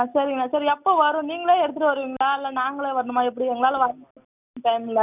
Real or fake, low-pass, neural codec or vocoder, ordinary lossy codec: real; 3.6 kHz; none; none